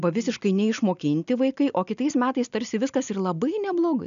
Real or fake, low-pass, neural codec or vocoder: real; 7.2 kHz; none